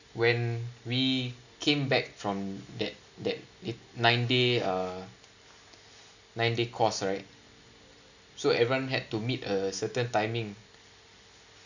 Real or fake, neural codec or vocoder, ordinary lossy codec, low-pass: real; none; none; 7.2 kHz